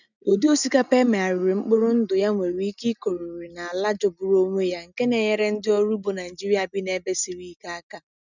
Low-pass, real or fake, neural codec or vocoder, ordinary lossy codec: 7.2 kHz; real; none; none